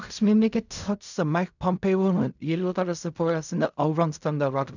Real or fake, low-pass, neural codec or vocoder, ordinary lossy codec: fake; 7.2 kHz; codec, 16 kHz in and 24 kHz out, 0.4 kbps, LongCat-Audio-Codec, fine tuned four codebook decoder; none